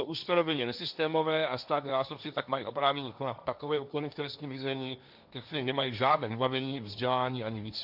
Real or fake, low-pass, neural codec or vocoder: fake; 5.4 kHz; codec, 16 kHz, 1.1 kbps, Voila-Tokenizer